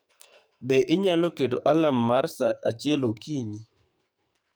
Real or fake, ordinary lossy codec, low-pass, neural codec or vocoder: fake; none; none; codec, 44.1 kHz, 2.6 kbps, SNAC